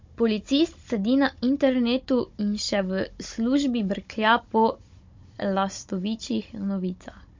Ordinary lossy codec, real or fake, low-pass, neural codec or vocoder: MP3, 48 kbps; fake; 7.2 kHz; codec, 16 kHz, 16 kbps, FunCodec, trained on Chinese and English, 50 frames a second